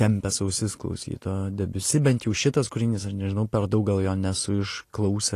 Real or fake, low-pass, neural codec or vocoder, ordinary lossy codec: real; 14.4 kHz; none; AAC, 48 kbps